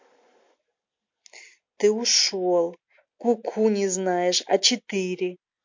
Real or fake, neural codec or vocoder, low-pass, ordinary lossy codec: real; none; 7.2 kHz; MP3, 48 kbps